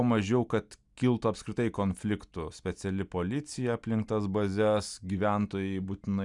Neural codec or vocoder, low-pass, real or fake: none; 10.8 kHz; real